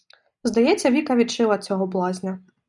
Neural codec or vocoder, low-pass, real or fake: none; 10.8 kHz; real